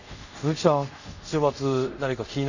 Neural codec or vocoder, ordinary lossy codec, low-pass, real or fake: codec, 24 kHz, 0.5 kbps, DualCodec; none; 7.2 kHz; fake